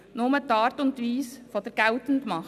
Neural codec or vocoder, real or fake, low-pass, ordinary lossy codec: none; real; 14.4 kHz; none